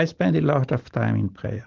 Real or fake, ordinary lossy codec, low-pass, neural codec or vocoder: real; Opus, 16 kbps; 7.2 kHz; none